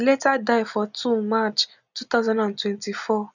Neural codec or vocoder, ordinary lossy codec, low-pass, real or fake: none; none; 7.2 kHz; real